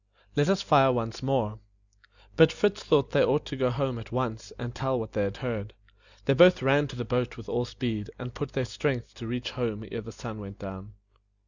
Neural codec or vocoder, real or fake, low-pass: none; real; 7.2 kHz